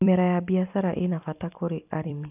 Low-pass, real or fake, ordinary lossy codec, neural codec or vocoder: 3.6 kHz; fake; none; vocoder, 44.1 kHz, 128 mel bands every 256 samples, BigVGAN v2